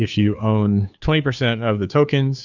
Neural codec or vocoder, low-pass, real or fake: codec, 16 kHz, 2 kbps, FunCodec, trained on Chinese and English, 25 frames a second; 7.2 kHz; fake